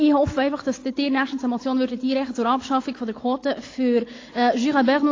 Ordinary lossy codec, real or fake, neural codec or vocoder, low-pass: AAC, 32 kbps; real; none; 7.2 kHz